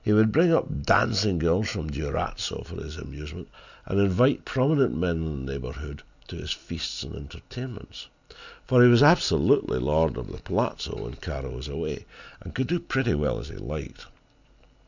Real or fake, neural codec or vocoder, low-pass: real; none; 7.2 kHz